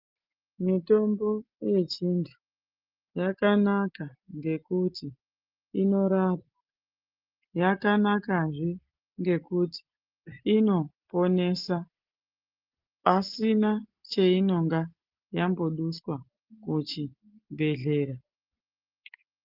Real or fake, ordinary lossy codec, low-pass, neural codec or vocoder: real; Opus, 24 kbps; 5.4 kHz; none